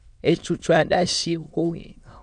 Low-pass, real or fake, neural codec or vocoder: 9.9 kHz; fake; autoencoder, 22.05 kHz, a latent of 192 numbers a frame, VITS, trained on many speakers